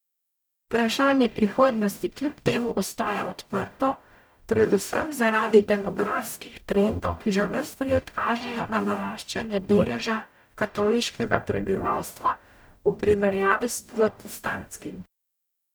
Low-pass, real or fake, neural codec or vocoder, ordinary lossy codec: none; fake; codec, 44.1 kHz, 0.9 kbps, DAC; none